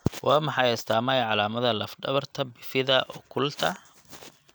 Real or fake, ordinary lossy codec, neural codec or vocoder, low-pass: real; none; none; none